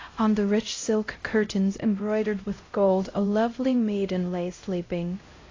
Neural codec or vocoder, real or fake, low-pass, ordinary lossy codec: codec, 16 kHz, 0.5 kbps, X-Codec, HuBERT features, trained on LibriSpeech; fake; 7.2 kHz; AAC, 32 kbps